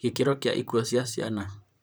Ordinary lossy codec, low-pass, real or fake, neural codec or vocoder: none; none; fake; vocoder, 44.1 kHz, 128 mel bands, Pupu-Vocoder